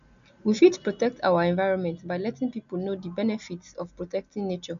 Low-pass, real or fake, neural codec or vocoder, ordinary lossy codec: 7.2 kHz; real; none; none